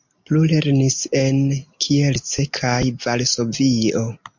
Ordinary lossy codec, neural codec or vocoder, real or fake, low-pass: MP3, 48 kbps; none; real; 7.2 kHz